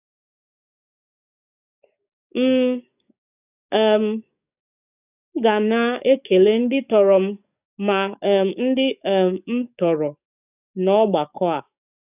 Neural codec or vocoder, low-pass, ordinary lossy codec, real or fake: codec, 44.1 kHz, 7.8 kbps, DAC; 3.6 kHz; none; fake